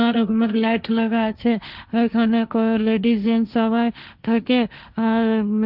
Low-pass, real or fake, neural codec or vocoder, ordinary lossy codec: 5.4 kHz; fake; codec, 16 kHz, 1.1 kbps, Voila-Tokenizer; none